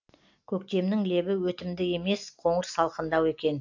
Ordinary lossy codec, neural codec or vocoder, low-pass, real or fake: AAC, 48 kbps; none; 7.2 kHz; real